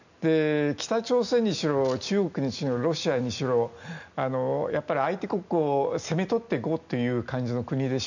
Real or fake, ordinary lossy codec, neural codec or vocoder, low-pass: real; none; none; 7.2 kHz